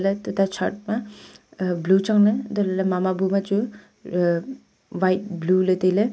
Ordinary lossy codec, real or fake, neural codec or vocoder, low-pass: none; real; none; none